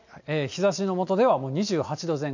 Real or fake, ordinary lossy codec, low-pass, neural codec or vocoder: real; none; 7.2 kHz; none